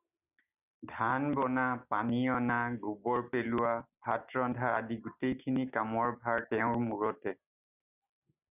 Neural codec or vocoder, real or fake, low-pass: none; real; 3.6 kHz